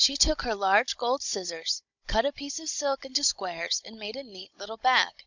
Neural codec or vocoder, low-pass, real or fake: codec, 16 kHz, 16 kbps, FunCodec, trained on Chinese and English, 50 frames a second; 7.2 kHz; fake